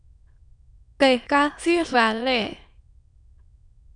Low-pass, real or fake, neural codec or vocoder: 9.9 kHz; fake; autoencoder, 22.05 kHz, a latent of 192 numbers a frame, VITS, trained on many speakers